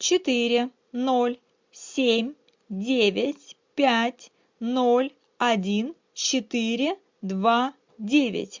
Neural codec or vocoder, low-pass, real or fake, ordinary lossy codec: none; 7.2 kHz; real; MP3, 64 kbps